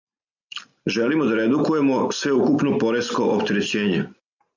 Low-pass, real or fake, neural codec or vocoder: 7.2 kHz; real; none